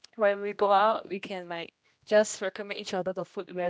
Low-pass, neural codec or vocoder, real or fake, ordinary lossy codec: none; codec, 16 kHz, 1 kbps, X-Codec, HuBERT features, trained on general audio; fake; none